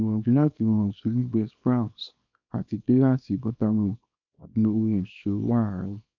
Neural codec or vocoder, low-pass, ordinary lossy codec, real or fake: codec, 24 kHz, 0.9 kbps, WavTokenizer, small release; 7.2 kHz; none; fake